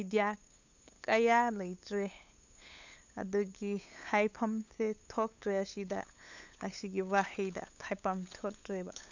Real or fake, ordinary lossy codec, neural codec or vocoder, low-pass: fake; none; codec, 16 kHz, 8 kbps, FunCodec, trained on LibriTTS, 25 frames a second; 7.2 kHz